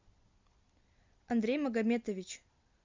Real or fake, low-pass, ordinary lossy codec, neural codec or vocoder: real; 7.2 kHz; MP3, 64 kbps; none